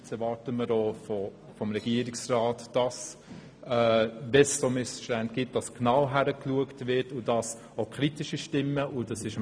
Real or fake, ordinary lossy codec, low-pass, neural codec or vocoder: real; none; none; none